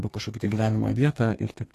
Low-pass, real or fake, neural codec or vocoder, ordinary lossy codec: 14.4 kHz; fake; codec, 32 kHz, 1.9 kbps, SNAC; AAC, 48 kbps